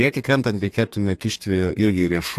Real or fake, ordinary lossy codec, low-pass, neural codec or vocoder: fake; AAC, 64 kbps; 14.4 kHz; codec, 32 kHz, 1.9 kbps, SNAC